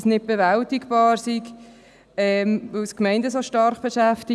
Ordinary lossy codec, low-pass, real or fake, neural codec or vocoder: none; none; real; none